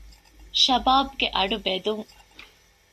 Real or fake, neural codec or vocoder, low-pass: real; none; 14.4 kHz